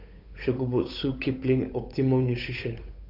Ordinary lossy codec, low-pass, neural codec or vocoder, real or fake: none; 5.4 kHz; codec, 16 kHz, 8 kbps, FunCodec, trained on Chinese and English, 25 frames a second; fake